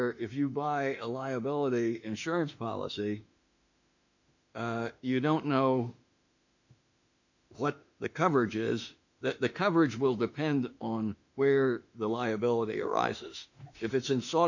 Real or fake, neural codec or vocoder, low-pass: fake; autoencoder, 48 kHz, 32 numbers a frame, DAC-VAE, trained on Japanese speech; 7.2 kHz